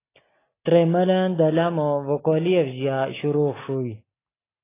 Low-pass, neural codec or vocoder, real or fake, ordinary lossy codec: 3.6 kHz; none; real; AAC, 16 kbps